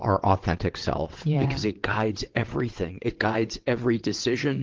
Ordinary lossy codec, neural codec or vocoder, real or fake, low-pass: Opus, 16 kbps; vocoder, 22.05 kHz, 80 mel bands, WaveNeXt; fake; 7.2 kHz